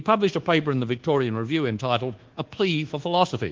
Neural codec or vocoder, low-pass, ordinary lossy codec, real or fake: codec, 24 kHz, 1.2 kbps, DualCodec; 7.2 kHz; Opus, 16 kbps; fake